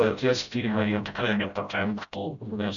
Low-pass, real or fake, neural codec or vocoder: 7.2 kHz; fake; codec, 16 kHz, 0.5 kbps, FreqCodec, smaller model